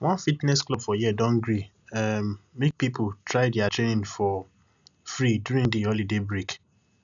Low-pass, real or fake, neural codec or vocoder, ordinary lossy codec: 7.2 kHz; real; none; none